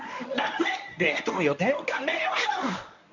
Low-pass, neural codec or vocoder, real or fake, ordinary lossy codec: 7.2 kHz; codec, 24 kHz, 0.9 kbps, WavTokenizer, medium speech release version 1; fake; none